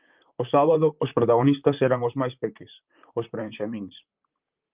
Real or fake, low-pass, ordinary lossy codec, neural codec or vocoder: fake; 3.6 kHz; Opus, 32 kbps; vocoder, 44.1 kHz, 128 mel bands, Pupu-Vocoder